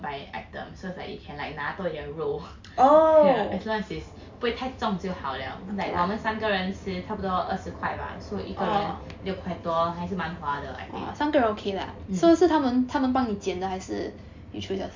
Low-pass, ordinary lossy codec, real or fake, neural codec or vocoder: 7.2 kHz; MP3, 64 kbps; real; none